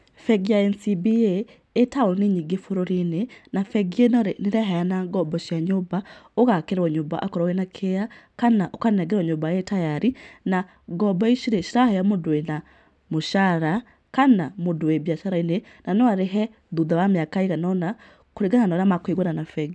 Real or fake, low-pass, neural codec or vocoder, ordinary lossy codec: real; none; none; none